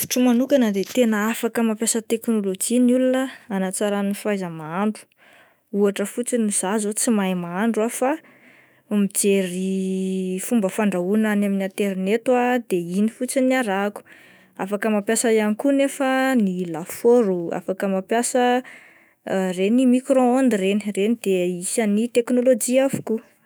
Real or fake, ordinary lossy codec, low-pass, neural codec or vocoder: fake; none; none; autoencoder, 48 kHz, 128 numbers a frame, DAC-VAE, trained on Japanese speech